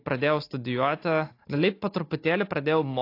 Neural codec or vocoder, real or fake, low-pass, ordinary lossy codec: none; real; 5.4 kHz; AAC, 32 kbps